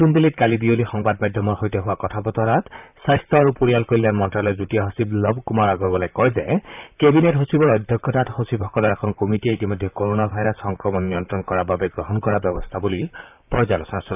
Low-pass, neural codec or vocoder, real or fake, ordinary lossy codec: 3.6 kHz; vocoder, 44.1 kHz, 128 mel bands, Pupu-Vocoder; fake; none